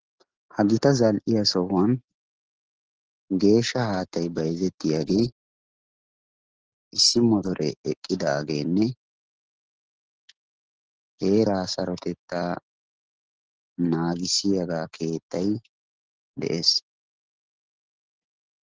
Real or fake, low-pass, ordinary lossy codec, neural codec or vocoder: real; 7.2 kHz; Opus, 16 kbps; none